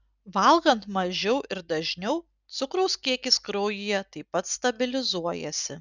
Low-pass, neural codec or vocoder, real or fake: 7.2 kHz; none; real